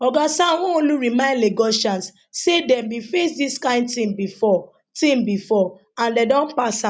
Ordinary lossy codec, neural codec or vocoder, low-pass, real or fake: none; none; none; real